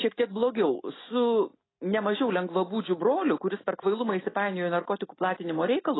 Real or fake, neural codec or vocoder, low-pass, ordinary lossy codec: real; none; 7.2 kHz; AAC, 16 kbps